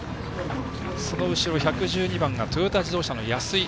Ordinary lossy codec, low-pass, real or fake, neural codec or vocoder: none; none; real; none